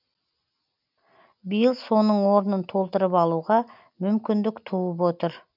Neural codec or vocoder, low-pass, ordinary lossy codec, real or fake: none; 5.4 kHz; none; real